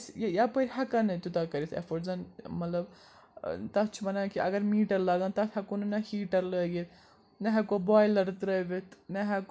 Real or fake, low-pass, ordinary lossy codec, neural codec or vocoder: real; none; none; none